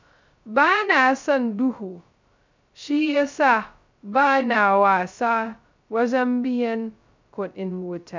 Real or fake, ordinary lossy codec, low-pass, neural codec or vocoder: fake; MP3, 64 kbps; 7.2 kHz; codec, 16 kHz, 0.2 kbps, FocalCodec